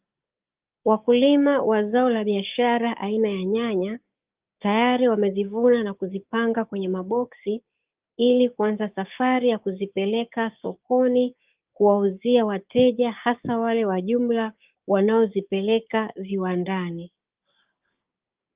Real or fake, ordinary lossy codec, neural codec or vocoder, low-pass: fake; Opus, 24 kbps; codec, 16 kHz, 6 kbps, DAC; 3.6 kHz